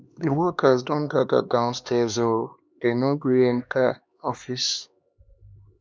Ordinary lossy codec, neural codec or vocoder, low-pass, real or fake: none; codec, 16 kHz, 2 kbps, X-Codec, HuBERT features, trained on LibriSpeech; none; fake